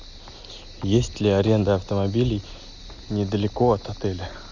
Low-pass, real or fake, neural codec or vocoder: 7.2 kHz; real; none